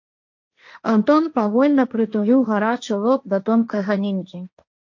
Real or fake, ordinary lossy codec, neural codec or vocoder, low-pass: fake; MP3, 48 kbps; codec, 16 kHz, 1.1 kbps, Voila-Tokenizer; 7.2 kHz